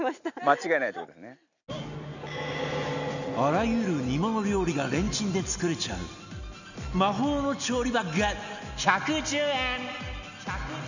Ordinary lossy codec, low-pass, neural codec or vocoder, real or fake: none; 7.2 kHz; none; real